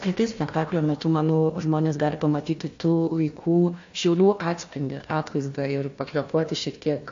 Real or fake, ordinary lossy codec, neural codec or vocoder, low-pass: fake; AAC, 48 kbps; codec, 16 kHz, 1 kbps, FunCodec, trained on Chinese and English, 50 frames a second; 7.2 kHz